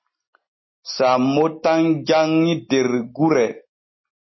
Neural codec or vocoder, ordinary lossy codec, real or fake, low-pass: none; MP3, 24 kbps; real; 7.2 kHz